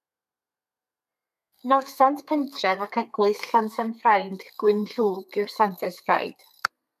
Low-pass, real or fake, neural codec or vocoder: 14.4 kHz; fake; codec, 32 kHz, 1.9 kbps, SNAC